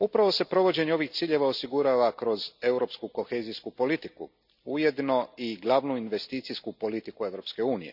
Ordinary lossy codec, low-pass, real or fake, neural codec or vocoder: none; 5.4 kHz; real; none